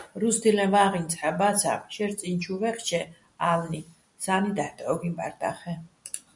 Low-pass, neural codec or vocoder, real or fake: 10.8 kHz; none; real